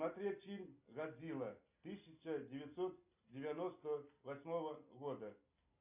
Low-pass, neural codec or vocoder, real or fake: 3.6 kHz; none; real